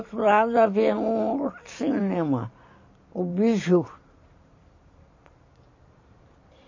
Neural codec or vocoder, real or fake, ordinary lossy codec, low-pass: vocoder, 44.1 kHz, 128 mel bands every 512 samples, BigVGAN v2; fake; MP3, 32 kbps; 7.2 kHz